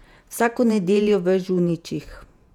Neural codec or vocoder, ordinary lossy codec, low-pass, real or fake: vocoder, 48 kHz, 128 mel bands, Vocos; none; 19.8 kHz; fake